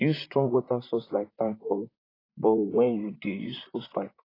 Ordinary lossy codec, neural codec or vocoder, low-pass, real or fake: AAC, 24 kbps; vocoder, 44.1 kHz, 128 mel bands, Pupu-Vocoder; 5.4 kHz; fake